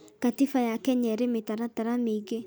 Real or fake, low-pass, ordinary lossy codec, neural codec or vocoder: real; none; none; none